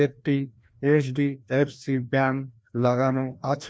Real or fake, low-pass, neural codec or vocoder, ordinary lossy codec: fake; none; codec, 16 kHz, 1 kbps, FreqCodec, larger model; none